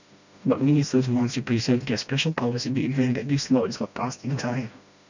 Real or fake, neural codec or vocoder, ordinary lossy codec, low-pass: fake; codec, 16 kHz, 1 kbps, FreqCodec, smaller model; none; 7.2 kHz